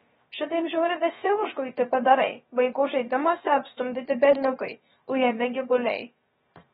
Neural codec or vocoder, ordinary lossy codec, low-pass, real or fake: codec, 16 kHz, 0.7 kbps, FocalCodec; AAC, 16 kbps; 7.2 kHz; fake